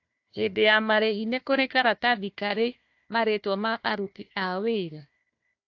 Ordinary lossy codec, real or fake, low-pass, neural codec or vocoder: AAC, 48 kbps; fake; 7.2 kHz; codec, 16 kHz, 1 kbps, FunCodec, trained on Chinese and English, 50 frames a second